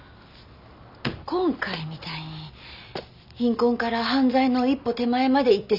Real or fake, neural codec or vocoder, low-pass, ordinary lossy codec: real; none; 5.4 kHz; none